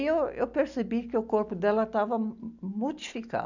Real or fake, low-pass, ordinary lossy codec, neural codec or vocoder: real; 7.2 kHz; none; none